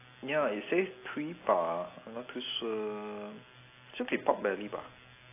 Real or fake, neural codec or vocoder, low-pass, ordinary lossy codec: real; none; 3.6 kHz; AAC, 24 kbps